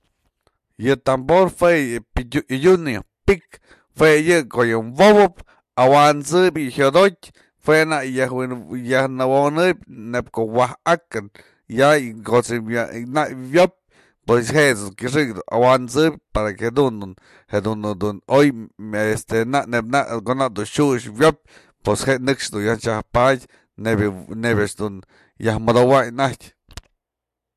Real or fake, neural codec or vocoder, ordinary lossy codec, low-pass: real; none; MP3, 64 kbps; 14.4 kHz